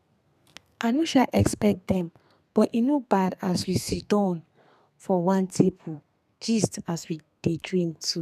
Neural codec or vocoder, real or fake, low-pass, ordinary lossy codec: codec, 32 kHz, 1.9 kbps, SNAC; fake; 14.4 kHz; none